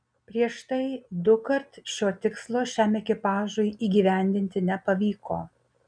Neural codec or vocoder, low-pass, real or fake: none; 9.9 kHz; real